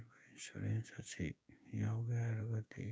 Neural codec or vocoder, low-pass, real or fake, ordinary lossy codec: codec, 16 kHz, 6 kbps, DAC; none; fake; none